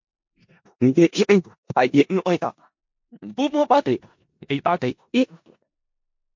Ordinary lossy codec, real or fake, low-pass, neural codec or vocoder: MP3, 48 kbps; fake; 7.2 kHz; codec, 16 kHz in and 24 kHz out, 0.4 kbps, LongCat-Audio-Codec, four codebook decoder